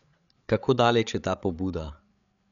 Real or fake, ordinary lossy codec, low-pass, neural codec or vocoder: fake; none; 7.2 kHz; codec, 16 kHz, 8 kbps, FreqCodec, larger model